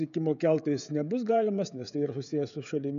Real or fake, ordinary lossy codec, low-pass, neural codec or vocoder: fake; AAC, 96 kbps; 7.2 kHz; codec, 16 kHz, 16 kbps, FreqCodec, smaller model